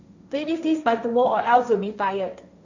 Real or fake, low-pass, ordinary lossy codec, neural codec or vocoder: fake; 7.2 kHz; none; codec, 16 kHz, 1.1 kbps, Voila-Tokenizer